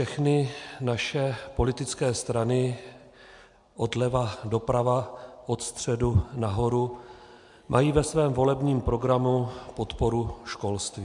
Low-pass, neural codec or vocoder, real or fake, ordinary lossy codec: 10.8 kHz; none; real; MP3, 64 kbps